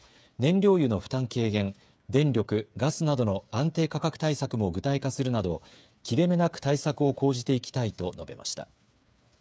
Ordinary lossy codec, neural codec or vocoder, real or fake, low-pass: none; codec, 16 kHz, 8 kbps, FreqCodec, smaller model; fake; none